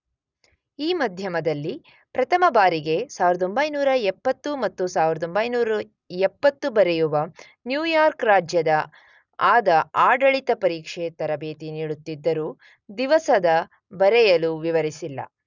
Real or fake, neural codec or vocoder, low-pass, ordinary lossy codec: real; none; 7.2 kHz; none